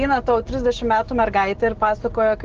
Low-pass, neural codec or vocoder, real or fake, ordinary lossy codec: 7.2 kHz; none; real; Opus, 16 kbps